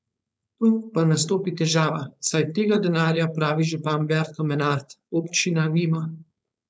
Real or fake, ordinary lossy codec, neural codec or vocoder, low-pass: fake; none; codec, 16 kHz, 4.8 kbps, FACodec; none